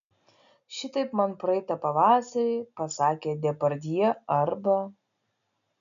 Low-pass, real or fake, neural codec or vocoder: 7.2 kHz; real; none